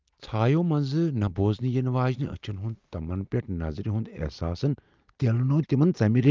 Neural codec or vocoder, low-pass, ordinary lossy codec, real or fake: none; 7.2 kHz; Opus, 24 kbps; real